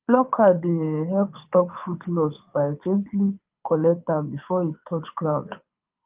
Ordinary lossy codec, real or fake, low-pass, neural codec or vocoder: Opus, 24 kbps; fake; 3.6 kHz; codec, 24 kHz, 6 kbps, HILCodec